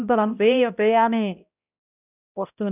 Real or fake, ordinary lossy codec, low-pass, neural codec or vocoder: fake; none; 3.6 kHz; codec, 16 kHz, 0.5 kbps, X-Codec, HuBERT features, trained on balanced general audio